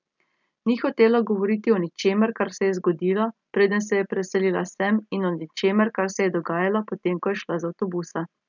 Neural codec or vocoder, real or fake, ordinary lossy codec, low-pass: none; real; none; 7.2 kHz